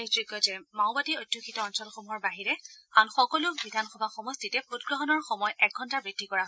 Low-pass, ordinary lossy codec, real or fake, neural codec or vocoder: none; none; real; none